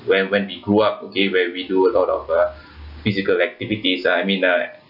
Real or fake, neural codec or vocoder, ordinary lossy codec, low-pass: real; none; none; 5.4 kHz